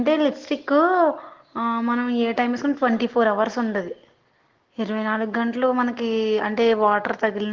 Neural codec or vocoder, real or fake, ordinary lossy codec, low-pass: none; real; Opus, 16 kbps; 7.2 kHz